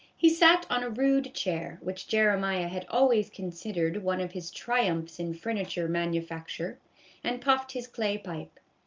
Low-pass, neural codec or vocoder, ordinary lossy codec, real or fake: 7.2 kHz; none; Opus, 24 kbps; real